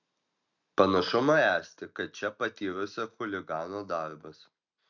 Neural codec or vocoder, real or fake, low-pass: none; real; 7.2 kHz